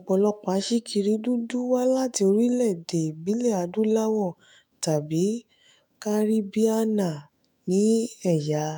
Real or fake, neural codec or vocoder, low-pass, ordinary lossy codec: fake; autoencoder, 48 kHz, 128 numbers a frame, DAC-VAE, trained on Japanese speech; none; none